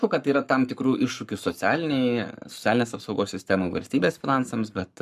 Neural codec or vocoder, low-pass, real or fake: codec, 44.1 kHz, 7.8 kbps, Pupu-Codec; 14.4 kHz; fake